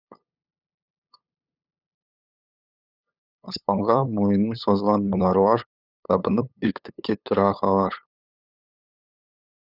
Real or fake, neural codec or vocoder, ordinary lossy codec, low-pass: fake; codec, 16 kHz, 8 kbps, FunCodec, trained on LibriTTS, 25 frames a second; none; 5.4 kHz